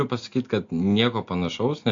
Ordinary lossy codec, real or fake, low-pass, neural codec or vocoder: MP3, 48 kbps; real; 7.2 kHz; none